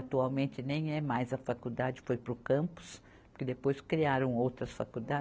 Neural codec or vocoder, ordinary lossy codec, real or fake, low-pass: none; none; real; none